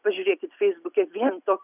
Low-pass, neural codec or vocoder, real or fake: 3.6 kHz; none; real